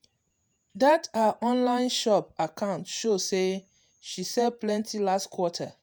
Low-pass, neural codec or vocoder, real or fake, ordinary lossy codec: none; vocoder, 48 kHz, 128 mel bands, Vocos; fake; none